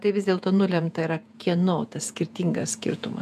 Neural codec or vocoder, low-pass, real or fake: vocoder, 48 kHz, 128 mel bands, Vocos; 14.4 kHz; fake